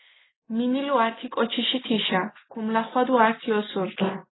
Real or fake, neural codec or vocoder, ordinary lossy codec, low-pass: real; none; AAC, 16 kbps; 7.2 kHz